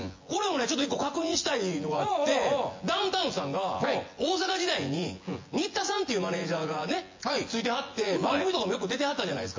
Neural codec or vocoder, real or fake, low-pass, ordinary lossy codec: vocoder, 24 kHz, 100 mel bands, Vocos; fake; 7.2 kHz; MP3, 32 kbps